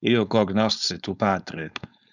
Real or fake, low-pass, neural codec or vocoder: fake; 7.2 kHz; codec, 16 kHz, 4.8 kbps, FACodec